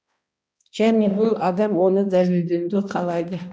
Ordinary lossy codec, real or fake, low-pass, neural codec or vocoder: none; fake; none; codec, 16 kHz, 1 kbps, X-Codec, HuBERT features, trained on balanced general audio